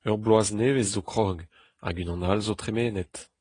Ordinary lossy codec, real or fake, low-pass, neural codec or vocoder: AAC, 32 kbps; real; 9.9 kHz; none